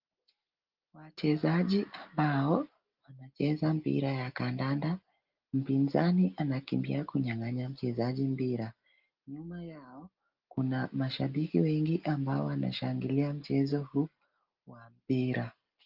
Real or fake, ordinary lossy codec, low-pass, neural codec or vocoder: real; Opus, 32 kbps; 5.4 kHz; none